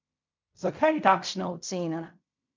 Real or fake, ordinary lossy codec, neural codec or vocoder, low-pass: fake; MP3, 64 kbps; codec, 16 kHz in and 24 kHz out, 0.4 kbps, LongCat-Audio-Codec, fine tuned four codebook decoder; 7.2 kHz